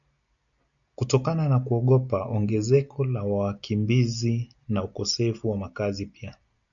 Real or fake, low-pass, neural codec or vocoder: real; 7.2 kHz; none